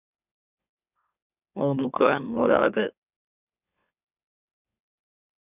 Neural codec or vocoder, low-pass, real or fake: autoencoder, 44.1 kHz, a latent of 192 numbers a frame, MeloTTS; 3.6 kHz; fake